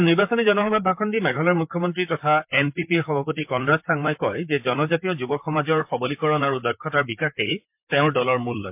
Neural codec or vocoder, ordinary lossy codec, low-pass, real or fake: vocoder, 44.1 kHz, 128 mel bands, Pupu-Vocoder; MP3, 32 kbps; 3.6 kHz; fake